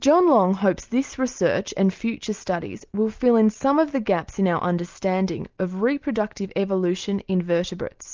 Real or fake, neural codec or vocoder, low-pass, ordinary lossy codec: real; none; 7.2 kHz; Opus, 24 kbps